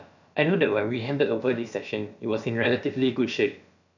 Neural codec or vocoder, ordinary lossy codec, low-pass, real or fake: codec, 16 kHz, about 1 kbps, DyCAST, with the encoder's durations; none; 7.2 kHz; fake